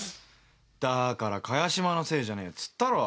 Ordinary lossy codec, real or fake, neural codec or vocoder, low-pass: none; real; none; none